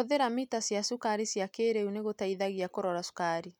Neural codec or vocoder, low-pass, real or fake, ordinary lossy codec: none; 19.8 kHz; real; none